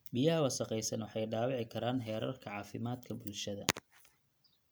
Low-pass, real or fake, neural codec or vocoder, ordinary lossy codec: none; real; none; none